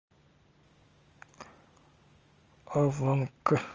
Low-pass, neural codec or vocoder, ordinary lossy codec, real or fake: 7.2 kHz; none; Opus, 24 kbps; real